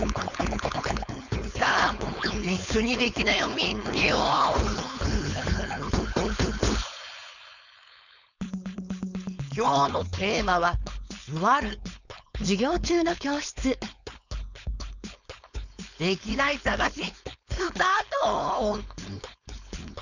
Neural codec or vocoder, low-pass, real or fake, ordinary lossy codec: codec, 16 kHz, 4.8 kbps, FACodec; 7.2 kHz; fake; none